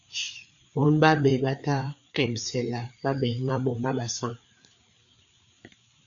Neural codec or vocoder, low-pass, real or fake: codec, 16 kHz, 4 kbps, FreqCodec, larger model; 7.2 kHz; fake